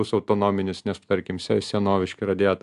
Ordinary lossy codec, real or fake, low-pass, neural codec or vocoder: AAC, 96 kbps; real; 10.8 kHz; none